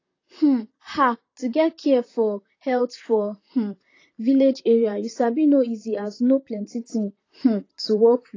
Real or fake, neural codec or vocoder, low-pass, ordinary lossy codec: fake; vocoder, 44.1 kHz, 128 mel bands, Pupu-Vocoder; 7.2 kHz; AAC, 32 kbps